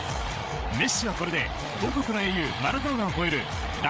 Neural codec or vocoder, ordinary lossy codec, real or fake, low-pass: codec, 16 kHz, 8 kbps, FreqCodec, larger model; none; fake; none